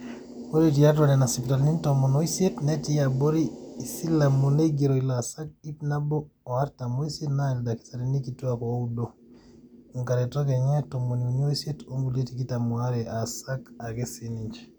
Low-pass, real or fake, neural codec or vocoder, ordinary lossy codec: none; real; none; none